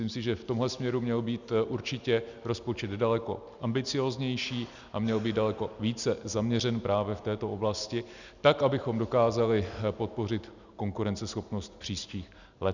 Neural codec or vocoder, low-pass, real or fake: none; 7.2 kHz; real